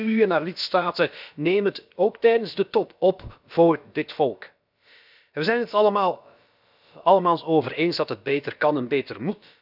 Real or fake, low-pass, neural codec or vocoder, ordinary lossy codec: fake; 5.4 kHz; codec, 16 kHz, about 1 kbps, DyCAST, with the encoder's durations; none